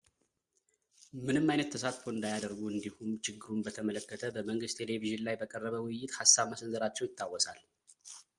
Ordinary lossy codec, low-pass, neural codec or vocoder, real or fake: Opus, 32 kbps; 10.8 kHz; none; real